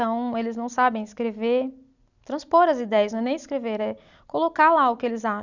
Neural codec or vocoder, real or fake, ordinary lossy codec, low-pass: none; real; none; 7.2 kHz